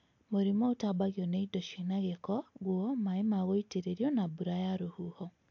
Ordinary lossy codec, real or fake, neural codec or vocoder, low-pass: AAC, 48 kbps; real; none; 7.2 kHz